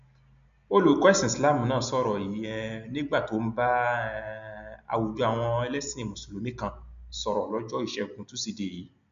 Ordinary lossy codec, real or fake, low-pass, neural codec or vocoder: MP3, 64 kbps; real; 7.2 kHz; none